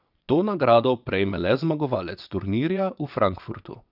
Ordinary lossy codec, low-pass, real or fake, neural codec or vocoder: none; 5.4 kHz; fake; vocoder, 22.05 kHz, 80 mel bands, WaveNeXt